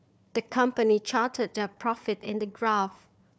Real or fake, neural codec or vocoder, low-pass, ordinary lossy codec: fake; codec, 16 kHz, 4 kbps, FunCodec, trained on Chinese and English, 50 frames a second; none; none